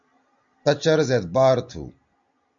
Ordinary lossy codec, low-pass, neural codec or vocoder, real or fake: AAC, 64 kbps; 7.2 kHz; none; real